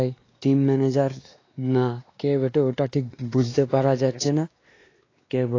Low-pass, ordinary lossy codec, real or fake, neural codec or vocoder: 7.2 kHz; AAC, 32 kbps; fake; codec, 16 kHz, 2 kbps, X-Codec, WavLM features, trained on Multilingual LibriSpeech